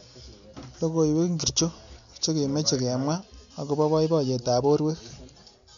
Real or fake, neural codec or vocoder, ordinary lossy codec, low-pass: real; none; none; 7.2 kHz